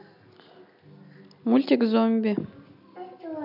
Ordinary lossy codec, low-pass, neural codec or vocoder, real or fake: none; 5.4 kHz; none; real